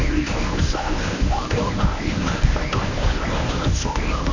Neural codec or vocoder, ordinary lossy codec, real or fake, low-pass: codec, 24 kHz, 0.9 kbps, WavTokenizer, medium speech release version 1; none; fake; 7.2 kHz